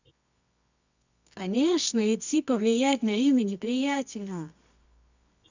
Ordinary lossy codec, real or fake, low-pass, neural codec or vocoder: none; fake; 7.2 kHz; codec, 24 kHz, 0.9 kbps, WavTokenizer, medium music audio release